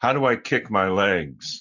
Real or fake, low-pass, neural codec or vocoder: real; 7.2 kHz; none